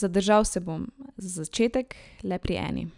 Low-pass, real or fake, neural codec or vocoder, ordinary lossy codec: 10.8 kHz; real; none; none